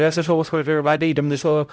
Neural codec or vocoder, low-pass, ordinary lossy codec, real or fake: codec, 16 kHz, 0.5 kbps, X-Codec, HuBERT features, trained on LibriSpeech; none; none; fake